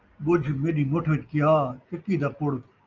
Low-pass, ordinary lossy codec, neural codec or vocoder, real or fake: 7.2 kHz; Opus, 24 kbps; none; real